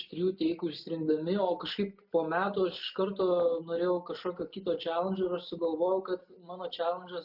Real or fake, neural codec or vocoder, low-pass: real; none; 5.4 kHz